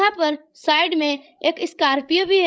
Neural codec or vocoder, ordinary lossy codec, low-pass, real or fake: codec, 16 kHz, 16 kbps, FreqCodec, larger model; none; none; fake